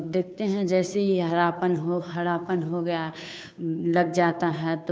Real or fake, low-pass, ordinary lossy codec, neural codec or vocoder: fake; none; none; codec, 16 kHz, 2 kbps, FunCodec, trained on Chinese and English, 25 frames a second